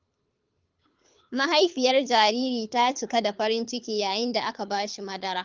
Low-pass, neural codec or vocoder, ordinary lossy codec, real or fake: 7.2 kHz; codec, 24 kHz, 6 kbps, HILCodec; Opus, 24 kbps; fake